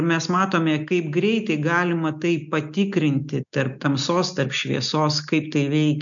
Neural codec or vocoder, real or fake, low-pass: none; real; 7.2 kHz